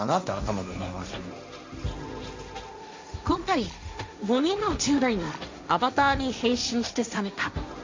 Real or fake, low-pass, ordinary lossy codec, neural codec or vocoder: fake; 7.2 kHz; MP3, 64 kbps; codec, 16 kHz, 1.1 kbps, Voila-Tokenizer